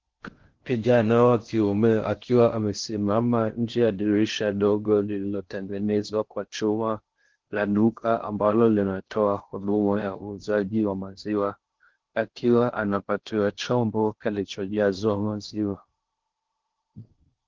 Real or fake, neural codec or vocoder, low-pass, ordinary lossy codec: fake; codec, 16 kHz in and 24 kHz out, 0.6 kbps, FocalCodec, streaming, 4096 codes; 7.2 kHz; Opus, 16 kbps